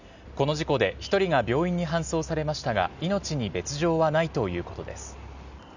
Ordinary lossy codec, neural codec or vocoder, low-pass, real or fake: none; none; 7.2 kHz; real